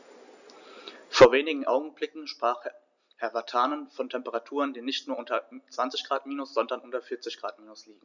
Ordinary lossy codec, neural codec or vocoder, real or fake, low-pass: none; none; real; 7.2 kHz